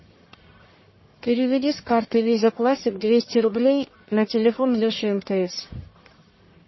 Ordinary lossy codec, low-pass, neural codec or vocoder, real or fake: MP3, 24 kbps; 7.2 kHz; codec, 44.1 kHz, 1.7 kbps, Pupu-Codec; fake